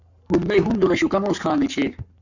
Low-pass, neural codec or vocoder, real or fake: 7.2 kHz; codec, 44.1 kHz, 7.8 kbps, Pupu-Codec; fake